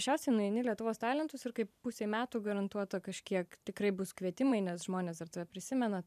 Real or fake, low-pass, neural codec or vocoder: fake; 14.4 kHz; vocoder, 44.1 kHz, 128 mel bands every 512 samples, BigVGAN v2